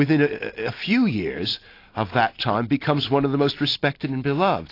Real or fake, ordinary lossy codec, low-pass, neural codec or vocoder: real; AAC, 32 kbps; 5.4 kHz; none